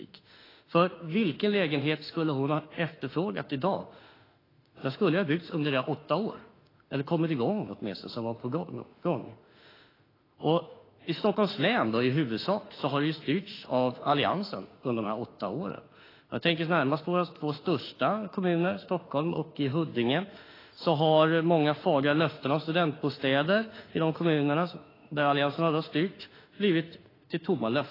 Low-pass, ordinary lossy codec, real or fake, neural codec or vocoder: 5.4 kHz; AAC, 24 kbps; fake; autoencoder, 48 kHz, 32 numbers a frame, DAC-VAE, trained on Japanese speech